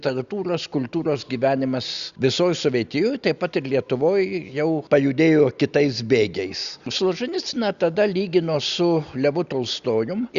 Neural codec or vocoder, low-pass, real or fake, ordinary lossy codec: none; 7.2 kHz; real; Opus, 64 kbps